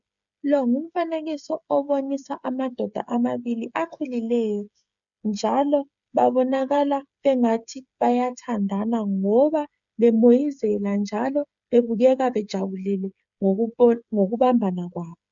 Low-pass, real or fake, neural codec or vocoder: 7.2 kHz; fake; codec, 16 kHz, 8 kbps, FreqCodec, smaller model